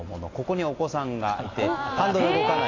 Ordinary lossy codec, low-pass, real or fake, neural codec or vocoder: AAC, 32 kbps; 7.2 kHz; real; none